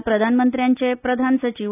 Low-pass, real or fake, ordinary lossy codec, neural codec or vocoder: 3.6 kHz; real; none; none